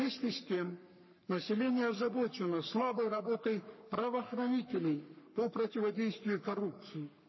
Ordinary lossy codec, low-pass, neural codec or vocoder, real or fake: MP3, 24 kbps; 7.2 kHz; codec, 44.1 kHz, 3.4 kbps, Pupu-Codec; fake